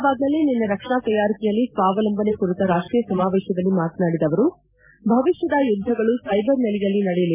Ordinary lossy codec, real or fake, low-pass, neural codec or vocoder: MP3, 32 kbps; real; 3.6 kHz; none